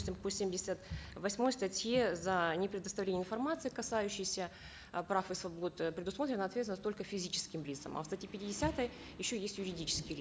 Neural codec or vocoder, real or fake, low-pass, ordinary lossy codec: none; real; none; none